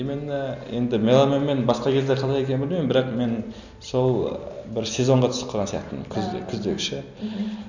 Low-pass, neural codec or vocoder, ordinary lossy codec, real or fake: 7.2 kHz; none; none; real